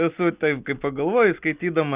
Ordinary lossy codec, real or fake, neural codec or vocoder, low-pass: Opus, 64 kbps; real; none; 3.6 kHz